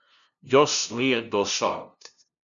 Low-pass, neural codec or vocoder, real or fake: 7.2 kHz; codec, 16 kHz, 0.5 kbps, FunCodec, trained on LibriTTS, 25 frames a second; fake